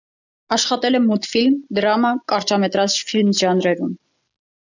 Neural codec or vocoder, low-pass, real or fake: none; 7.2 kHz; real